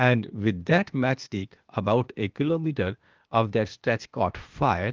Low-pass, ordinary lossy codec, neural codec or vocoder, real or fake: 7.2 kHz; Opus, 32 kbps; codec, 16 kHz, 0.8 kbps, ZipCodec; fake